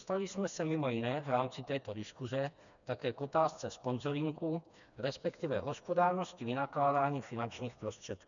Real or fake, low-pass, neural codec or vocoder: fake; 7.2 kHz; codec, 16 kHz, 2 kbps, FreqCodec, smaller model